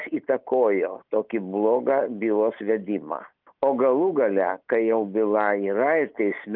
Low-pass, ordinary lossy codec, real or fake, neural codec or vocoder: 5.4 kHz; Opus, 32 kbps; real; none